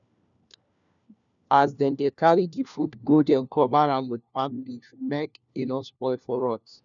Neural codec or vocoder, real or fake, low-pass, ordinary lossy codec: codec, 16 kHz, 1 kbps, FunCodec, trained on LibriTTS, 50 frames a second; fake; 7.2 kHz; none